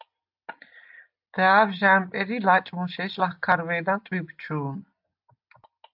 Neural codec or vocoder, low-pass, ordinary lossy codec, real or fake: none; 5.4 kHz; AAC, 48 kbps; real